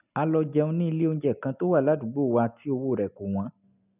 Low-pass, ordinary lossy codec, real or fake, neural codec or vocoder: 3.6 kHz; none; real; none